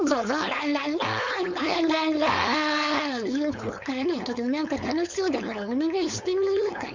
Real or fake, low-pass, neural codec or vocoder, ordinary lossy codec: fake; 7.2 kHz; codec, 16 kHz, 4.8 kbps, FACodec; MP3, 64 kbps